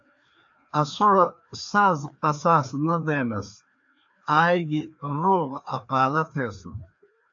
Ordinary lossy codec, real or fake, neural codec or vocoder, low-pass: AAC, 64 kbps; fake; codec, 16 kHz, 2 kbps, FreqCodec, larger model; 7.2 kHz